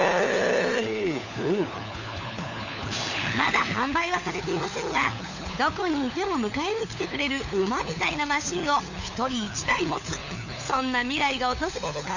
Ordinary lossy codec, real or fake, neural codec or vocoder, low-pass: none; fake; codec, 16 kHz, 4 kbps, FunCodec, trained on LibriTTS, 50 frames a second; 7.2 kHz